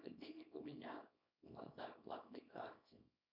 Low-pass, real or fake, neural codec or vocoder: 5.4 kHz; fake; codec, 24 kHz, 0.9 kbps, WavTokenizer, small release